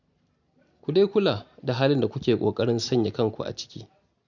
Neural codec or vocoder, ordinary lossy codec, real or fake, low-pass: none; none; real; 7.2 kHz